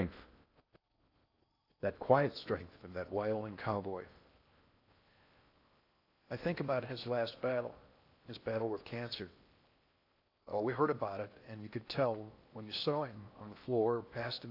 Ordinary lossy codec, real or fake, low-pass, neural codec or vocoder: AAC, 32 kbps; fake; 5.4 kHz; codec, 16 kHz in and 24 kHz out, 0.6 kbps, FocalCodec, streaming, 4096 codes